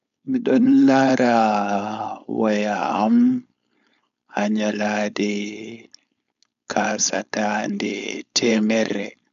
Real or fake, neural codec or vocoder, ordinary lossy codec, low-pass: fake; codec, 16 kHz, 4.8 kbps, FACodec; MP3, 96 kbps; 7.2 kHz